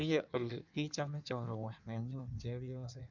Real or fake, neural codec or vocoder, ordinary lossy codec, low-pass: fake; codec, 16 kHz in and 24 kHz out, 1.1 kbps, FireRedTTS-2 codec; none; 7.2 kHz